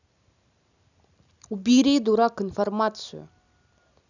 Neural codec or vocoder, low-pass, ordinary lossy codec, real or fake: none; 7.2 kHz; none; real